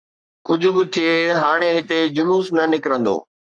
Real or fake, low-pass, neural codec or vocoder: fake; 9.9 kHz; codec, 44.1 kHz, 3.4 kbps, Pupu-Codec